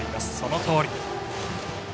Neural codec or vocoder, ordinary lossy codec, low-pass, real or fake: none; none; none; real